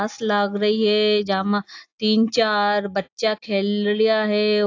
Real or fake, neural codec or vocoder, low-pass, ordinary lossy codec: real; none; 7.2 kHz; AAC, 48 kbps